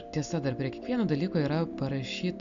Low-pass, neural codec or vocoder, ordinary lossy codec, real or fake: 7.2 kHz; none; AAC, 96 kbps; real